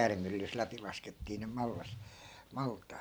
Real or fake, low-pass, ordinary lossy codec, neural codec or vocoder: fake; none; none; vocoder, 44.1 kHz, 128 mel bands every 512 samples, BigVGAN v2